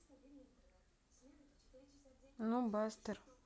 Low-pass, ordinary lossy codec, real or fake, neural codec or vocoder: none; none; real; none